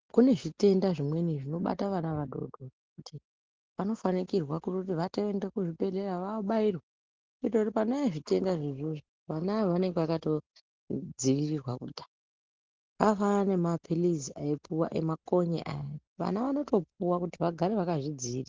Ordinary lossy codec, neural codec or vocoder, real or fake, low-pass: Opus, 16 kbps; none; real; 7.2 kHz